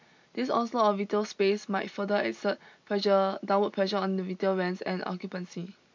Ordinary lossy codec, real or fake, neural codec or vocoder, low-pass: MP3, 64 kbps; real; none; 7.2 kHz